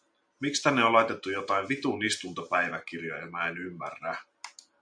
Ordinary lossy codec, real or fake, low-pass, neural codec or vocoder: MP3, 64 kbps; real; 9.9 kHz; none